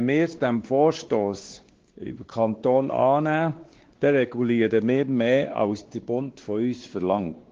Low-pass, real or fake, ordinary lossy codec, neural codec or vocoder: 7.2 kHz; fake; Opus, 16 kbps; codec, 16 kHz, 2 kbps, X-Codec, WavLM features, trained on Multilingual LibriSpeech